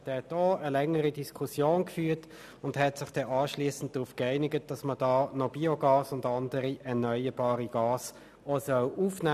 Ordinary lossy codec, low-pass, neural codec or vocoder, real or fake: none; 14.4 kHz; none; real